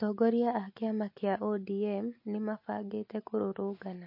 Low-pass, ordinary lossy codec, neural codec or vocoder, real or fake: 5.4 kHz; MP3, 32 kbps; none; real